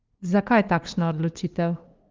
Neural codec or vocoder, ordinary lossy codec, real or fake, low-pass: codec, 16 kHz, 2 kbps, FunCodec, trained on LibriTTS, 25 frames a second; Opus, 32 kbps; fake; 7.2 kHz